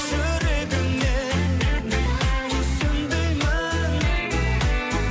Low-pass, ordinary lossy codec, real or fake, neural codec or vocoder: none; none; real; none